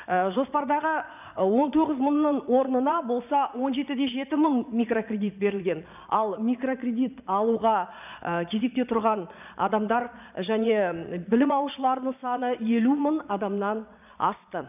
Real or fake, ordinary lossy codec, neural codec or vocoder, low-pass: fake; none; vocoder, 22.05 kHz, 80 mel bands, Vocos; 3.6 kHz